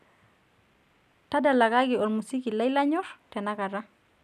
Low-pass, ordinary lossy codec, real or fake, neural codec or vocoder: 14.4 kHz; none; real; none